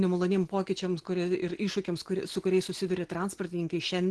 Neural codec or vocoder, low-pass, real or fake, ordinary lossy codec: none; 10.8 kHz; real; Opus, 16 kbps